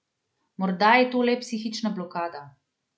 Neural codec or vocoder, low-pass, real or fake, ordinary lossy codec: none; none; real; none